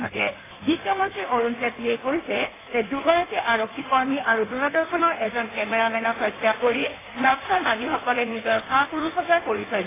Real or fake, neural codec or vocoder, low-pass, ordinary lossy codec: fake; codec, 16 kHz in and 24 kHz out, 1.1 kbps, FireRedTTS-2 codec; 3.6 kHz; AAC, 16 kbps